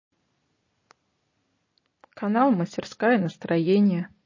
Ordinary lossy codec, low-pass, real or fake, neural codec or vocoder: MP3, 32 kbps; 7.2 kHz; real; none